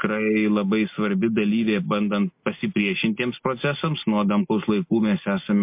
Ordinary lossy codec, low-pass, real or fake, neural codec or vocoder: MP3, 32 kbps; 3.6 kHz; real; none